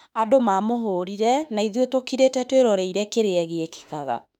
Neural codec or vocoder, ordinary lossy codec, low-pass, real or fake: autoencoder, 48 kHz, 32 numbers a frame, DAC-VAE, trained on Japanese speech; none; 19.8 kHz; fake